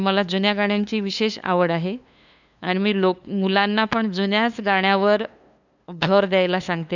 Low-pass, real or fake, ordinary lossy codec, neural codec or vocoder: 7.2 kHz; fake; none; codec, 16 kHz, 2 kbps, FunCodec, trained on LibriTTS, 25 frames a second